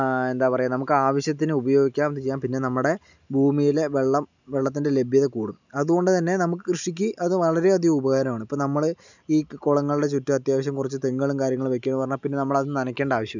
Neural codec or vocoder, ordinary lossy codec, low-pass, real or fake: none; none; 7.2 kHz; real